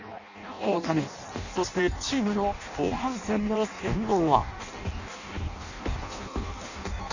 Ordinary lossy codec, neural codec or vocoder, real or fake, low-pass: none; codec, 16 kHz in and 24 kHz out, 0.6 kbps, FireRedTTS-2 codec; fake; 7.2 kHz